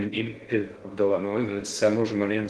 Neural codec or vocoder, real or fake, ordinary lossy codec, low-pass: codec, 16 kHz in and 24 kHz out, 0.6 kbps, FocalCodec, streaming, 4096 codes; fake; Opus, 16 kbps; 10.8 kHz